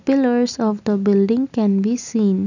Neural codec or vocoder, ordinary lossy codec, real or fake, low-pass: none; none; real; 7.2 kHz